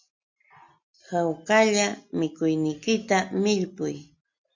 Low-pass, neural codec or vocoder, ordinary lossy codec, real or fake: 7.2 kHz; none; MP3, 48 kbps; real